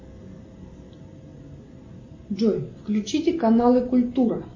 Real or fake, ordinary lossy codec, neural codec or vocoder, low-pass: real; MP3, 32 kbps; none; 7.2 kHz